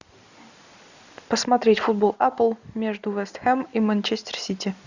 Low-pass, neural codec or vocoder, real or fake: 7.2 kHz; none; real